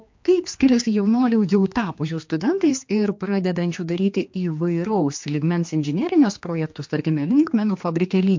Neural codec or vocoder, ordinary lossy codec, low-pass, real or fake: codec, 16 kHz, 2 kbps, X-Codec, HuBERT features, trained on general audio; MP3, 48 kbps; 7.2 kHz; fake